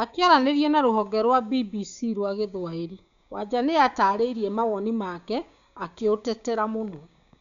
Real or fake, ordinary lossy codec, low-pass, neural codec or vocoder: fake; none; 7.2 kHz; codec, 16 kHz, 6 kbps, DAC